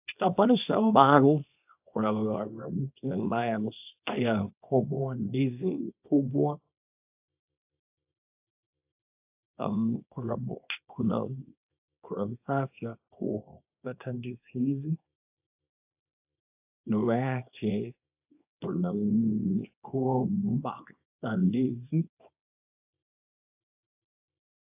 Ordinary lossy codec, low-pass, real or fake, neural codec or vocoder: AAC, 32 kbps; 3.6 kHz; fake; codec, 24 kHz, 0.9 kbps, WavTokenizer, small release